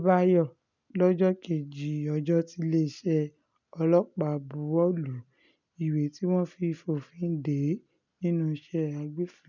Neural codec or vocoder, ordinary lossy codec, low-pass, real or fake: none; none; 7.2 kHz; real